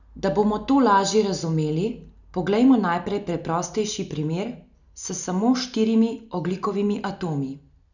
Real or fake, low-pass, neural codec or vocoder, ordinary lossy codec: real; 7.2 kHz; none; none